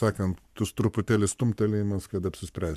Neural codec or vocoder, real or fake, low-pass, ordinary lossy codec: codec, 44.1 kHz, 7.8 kbps, Pupu-Codec; fake; 14.4 kHz; MP3, 96 kbps